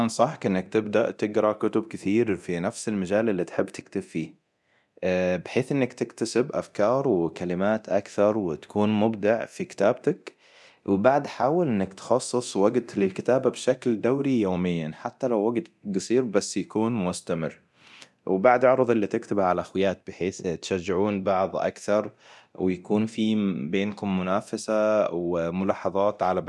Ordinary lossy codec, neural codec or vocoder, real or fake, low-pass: none; codec, 24 kHz, 0.9 kbps, DualCodec; fake; none